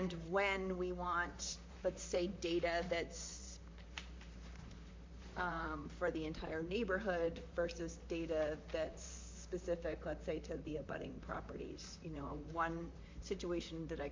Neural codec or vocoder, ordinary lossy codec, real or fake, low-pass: vocoder, 44.1 kHz, 128 mel bands, Pupu-Vocoder; MP3, 48 kbps; fake; 7.2 kHz